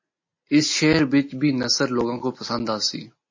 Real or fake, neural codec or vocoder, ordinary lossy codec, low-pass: real; none; MP3, 32 kbps; 7.2 kHz